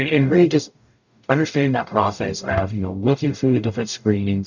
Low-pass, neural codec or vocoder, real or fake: 7.2 kHz; codec, 44.1 kHz, 0.9 kbps, DAC; fake